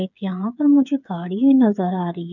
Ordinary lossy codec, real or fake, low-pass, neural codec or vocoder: none; fake; 7.2 kHz; codec, 16 kHz, 8 kbps, FreqCodec, smaller model